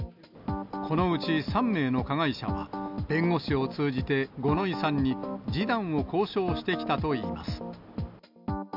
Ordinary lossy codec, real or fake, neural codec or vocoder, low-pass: none; real; none; 5.4 kHz